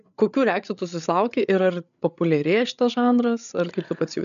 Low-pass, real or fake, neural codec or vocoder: 7.2 kHz; fake; codec, 16 kHz, 8 kbps, FreqCodec, larger model